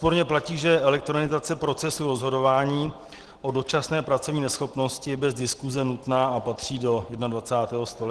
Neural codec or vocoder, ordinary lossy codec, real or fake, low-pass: none; Opus, 16 kbps; real; 10.8 kHz